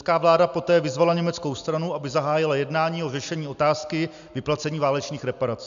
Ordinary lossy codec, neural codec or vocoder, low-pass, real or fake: AAC, 96 kbps; none; 7.2 kHz; real